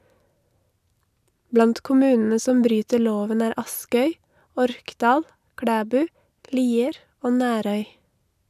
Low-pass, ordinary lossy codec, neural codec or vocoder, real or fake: 14.4 kHz; none; none; real